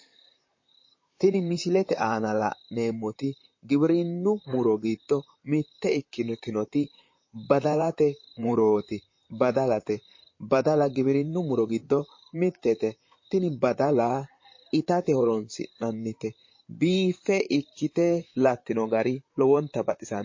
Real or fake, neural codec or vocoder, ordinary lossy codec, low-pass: fake; vocoder, 44.1 kHz, 128 mel bands, Pupu-Vocoder; MP3, 32 kbps; 7.2 kHz